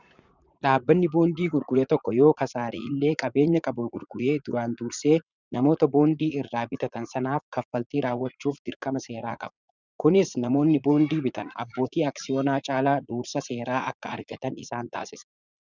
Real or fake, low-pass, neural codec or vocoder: fake; 7.2 kHz; vocoder, 44.1 kHz, 80 mel bands, Vocos